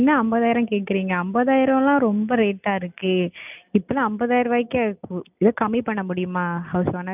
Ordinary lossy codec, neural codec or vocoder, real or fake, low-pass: none; none; real; 3.6 kHz